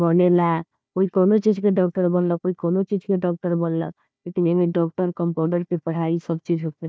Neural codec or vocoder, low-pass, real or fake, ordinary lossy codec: codec, 16 kHz, 1 kbps, FunCodec, trained on Chinese and English, 50 frames a second; none; fake; none